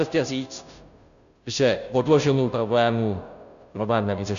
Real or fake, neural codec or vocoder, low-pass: fake; codec, 16 kHz, 0.5 kbps, FunCodec, trained on Chinese and English, 25 frames a second; 7.2 kHz